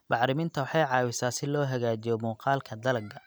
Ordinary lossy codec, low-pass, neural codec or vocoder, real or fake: none; none; none; real